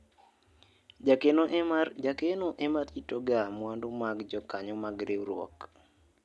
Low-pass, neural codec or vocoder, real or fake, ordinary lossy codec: none; none; real; none